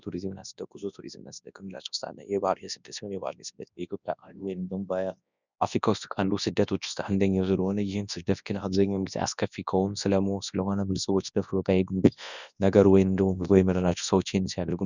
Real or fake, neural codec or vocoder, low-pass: fake; codec, 24 kHz, 0.9 kbps, WavTokenizer, large speech release; 7.2 kHz